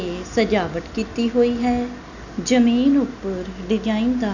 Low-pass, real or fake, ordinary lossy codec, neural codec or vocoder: 7.2 kHz; real; none; none